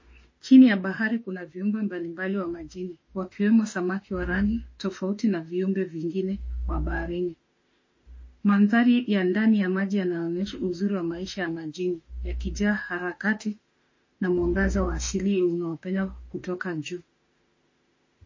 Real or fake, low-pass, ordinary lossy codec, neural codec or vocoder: fake; 7.2 kHz; MP3, 32 kbps; autoencoder, 48 kHz, 32 numbers a frame, DAC-VAE, trained on Japanese speech